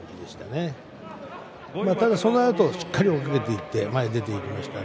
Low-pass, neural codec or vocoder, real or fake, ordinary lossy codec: none; none; real; none